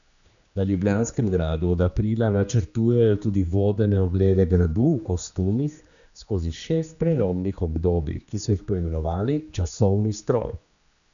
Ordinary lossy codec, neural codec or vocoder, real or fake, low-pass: none; codec, 16 kHz, 2 kbps, X-Codec, HuBERT features, trained on general audio; fake; 7.2 kHz